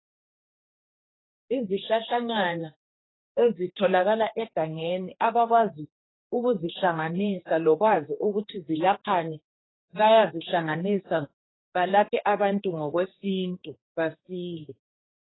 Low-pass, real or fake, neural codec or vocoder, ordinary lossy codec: 7.2 kHz; fake; codec, 16 kHz, 2 kbps, X-Codec, HuBERT features, trained on general audio; AAC, 16 kbps